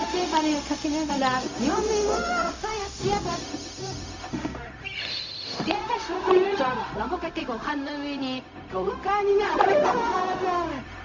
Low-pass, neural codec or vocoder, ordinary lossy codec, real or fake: 7.2 kHz; codec, 16 kHz, 0.4 kbps, LongCat-Audio-Codec; Opus, 64 kbps; fake